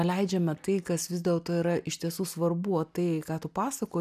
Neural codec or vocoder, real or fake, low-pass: none; real; 14.4 kHz